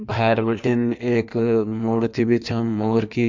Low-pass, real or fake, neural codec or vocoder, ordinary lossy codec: 7.2 kHz; fake; codec, 16 kHz in and 24 kHz out, 1.1 kbps, FireRedTTS-2 codec; MP3, 64 kbps